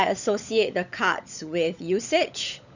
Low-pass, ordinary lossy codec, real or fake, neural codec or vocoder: 7.2 kHz; none; fake; codec, 16 kHz, 16 kbps, FunCodec, trained on LibriTTS, 50 frames a second